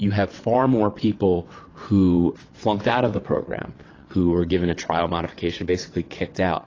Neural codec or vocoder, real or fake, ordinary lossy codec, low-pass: vocoder, 22.05 kHz, 80 mel bands, WaveNeXt; fake; AAC, 32 kbps; 7.2 kHz